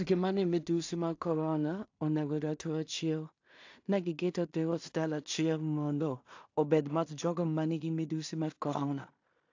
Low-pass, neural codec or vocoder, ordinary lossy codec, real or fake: 7.2 kHz; codec, 16 kHz in and 24 kHz out, 0.4 kbps, LongCat-Audio-Codec, two codebook decoder; AAC, 48 kbps; fake